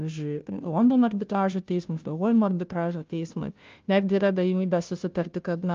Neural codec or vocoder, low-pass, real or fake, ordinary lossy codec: codec, 16 kHz, 0.5 kbps, FunCodec, trained on Chinese and English, 25 frames a second; 7.2 kHz; fake; Opus, 24 kbps